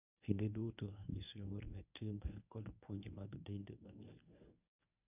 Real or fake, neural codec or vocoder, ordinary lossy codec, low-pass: fake; codec, 24 kHz, 0.9 kbps, WavTokenizer, medium speech release version 1; none; 3.6 kHz